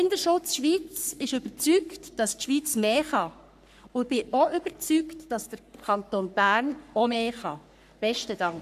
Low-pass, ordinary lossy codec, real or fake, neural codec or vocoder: 14.4 kHz; none; fake; codec, 44.1 kHz, 3.4 kbps, Pupu-Codec